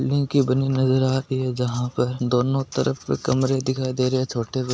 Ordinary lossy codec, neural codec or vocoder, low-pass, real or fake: none; none; none; real